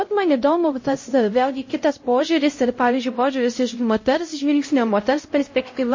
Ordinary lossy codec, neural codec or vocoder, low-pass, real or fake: MP3, 32 kbps; codec, 16 kHz, 0.5 kbps, X-Codec, HuBERT features, trained on LibriSpeech; 7.2 kHz; fake